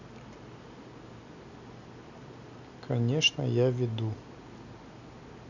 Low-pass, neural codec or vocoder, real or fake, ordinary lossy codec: 7.2 kHz; none; real; none